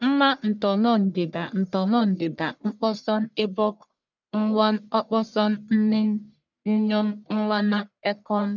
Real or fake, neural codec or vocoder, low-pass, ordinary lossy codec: fake; codec, 44.1 kHz, 1.7 kbps, Pupu-Codec; 7.2 kHz; none